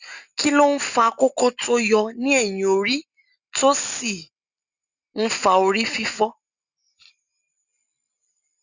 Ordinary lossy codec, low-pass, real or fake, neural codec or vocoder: Opus, 64 kbps; 7.2 kHz; real; none